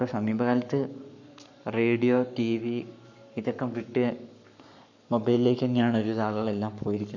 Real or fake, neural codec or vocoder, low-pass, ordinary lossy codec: fake; codec, 44.1 kHz, 7.8 kbps, Pupu-Codec; 7.2 kHz; none